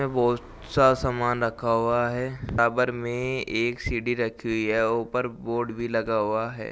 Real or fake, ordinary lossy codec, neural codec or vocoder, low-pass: real; none; none; none